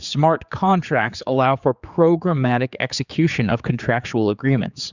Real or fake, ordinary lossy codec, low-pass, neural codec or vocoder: fake; Opus, 64 kbps; 7.2 kHz; codec, 16 kHz, 4 kbps, X-Codec, HuBERT features, trained on general audio